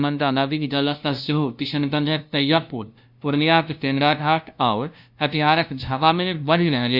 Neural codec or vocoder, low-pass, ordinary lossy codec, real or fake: codec, 16 kHz, 0.5 kbps, FunCodec, trained on LibriTTS, 25 frames a second; 5.4 kHz; none; fake